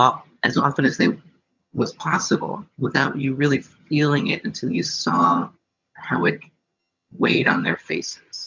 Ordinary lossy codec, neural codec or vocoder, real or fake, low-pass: MP3, 64 kbps; vocoder, 22.05 kHz, 80 mel bands, HiFi-GAN; fake; 7.2 kHz